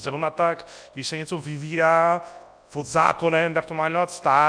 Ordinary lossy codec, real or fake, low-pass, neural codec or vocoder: AAC, 64 kbps; fake; 9.9 kHz; codec, 24 kHz, 0.9 kbps, WavTokenizer, large speech release